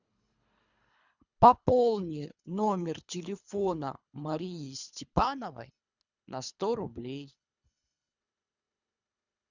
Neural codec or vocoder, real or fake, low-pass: codec, 24 kHz, 3 kbps, HILCodec; fake; 7.2 kHz